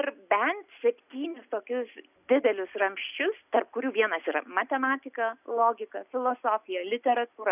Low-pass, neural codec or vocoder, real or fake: 3.6 kHz; none; real